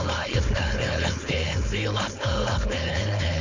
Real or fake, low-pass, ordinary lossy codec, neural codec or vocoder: fake; 7.2 kHz; MP3, 64 kbps; codec, 16 kHz, 4.8 kbps, FACodec